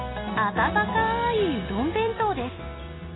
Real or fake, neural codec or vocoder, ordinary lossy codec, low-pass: real; none; AAC, 16 kbps; 7.2 kHz